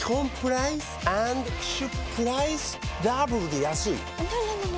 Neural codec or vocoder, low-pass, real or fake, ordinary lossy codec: none; none; real; none